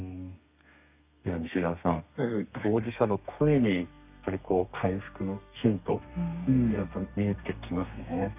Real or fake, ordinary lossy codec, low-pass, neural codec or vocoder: fake; none; 3.6 kHz; codec, 32 kHz, 1.9 kbps, SNAC